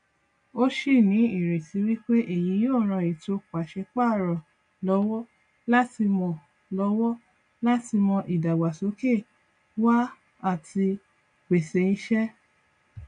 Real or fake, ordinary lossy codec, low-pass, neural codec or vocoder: real; none; 9.9 kHz; none